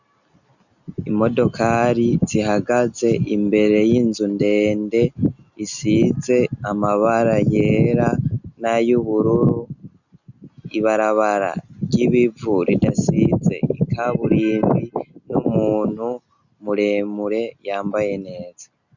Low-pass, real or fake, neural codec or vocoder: 7.2 kHz; real; none